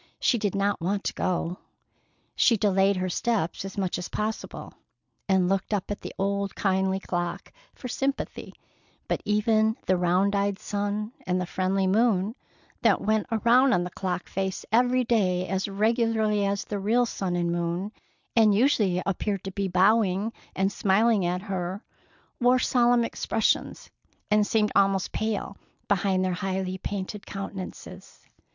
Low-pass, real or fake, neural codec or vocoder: 7.2 kHz; real; none